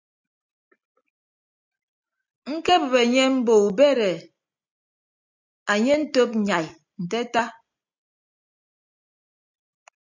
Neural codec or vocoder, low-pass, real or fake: none; 7.2 kHz; real